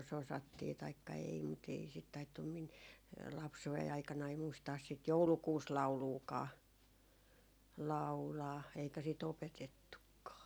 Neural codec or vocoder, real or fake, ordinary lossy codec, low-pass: none; real; none; none